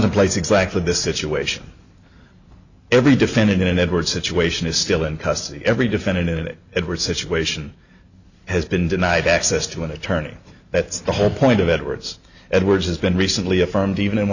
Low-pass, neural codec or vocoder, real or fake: 7.2 kHz; none; real